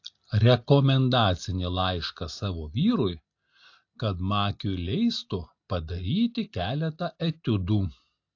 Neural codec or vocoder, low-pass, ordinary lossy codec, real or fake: none; 7.2 kHz; AAC, 48 kbps; real